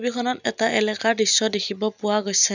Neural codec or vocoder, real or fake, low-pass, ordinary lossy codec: none; real; 7.2 kHz; none